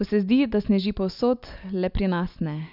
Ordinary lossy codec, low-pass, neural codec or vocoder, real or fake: none; 5.4 kHz; none; real